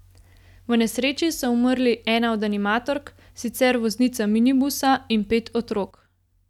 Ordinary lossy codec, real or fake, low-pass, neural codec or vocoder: none; real; 19.8 kHz; none